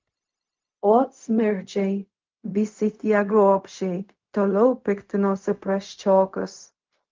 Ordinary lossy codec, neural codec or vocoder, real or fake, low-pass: Opus, 24 kbps; codec, 16 kHz, 0.4 kbps, LongCat-Audio-Codec; fake; 7.2 kHz